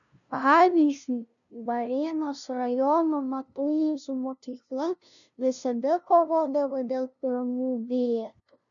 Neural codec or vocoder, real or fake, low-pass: codec, 16 kHz, 0.5 kbps, FunCodec, trained on LibriTTS, 25 frames a second; fake; 7.2 kHz